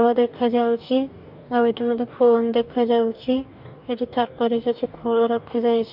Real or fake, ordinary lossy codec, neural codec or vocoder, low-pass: fake; none; codec, 44.1 kHz, 2.6 kbps, DAC; 5.4 kHz